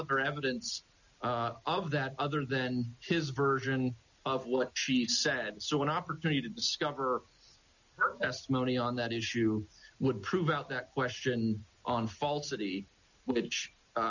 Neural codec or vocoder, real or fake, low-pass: none; real; 7.2 kHz